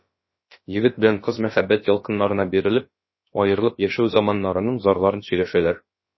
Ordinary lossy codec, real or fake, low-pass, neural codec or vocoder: MP3, 24 kbps; fake; 7.2 kHz; codec, 16 kHz, about 1 kbps, DyCAST, with the encoder's durations